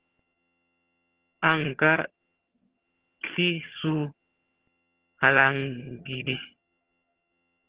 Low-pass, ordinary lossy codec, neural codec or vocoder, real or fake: 3.6 kHz; Opus, 16 kbps; vocoder, 22.05 kHz, 80 mel bands, HiFi-GAN; fake